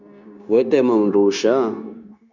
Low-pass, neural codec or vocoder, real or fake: 7.2 kHz; codec, 16 kHz, 0.9 kbps, LongCat-Audio-Codec; fake